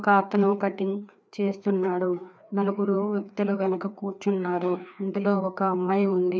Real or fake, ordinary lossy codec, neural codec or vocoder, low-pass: fake; none; codec, 16 kHz, 2 kbps, FreqCodec, larger model; none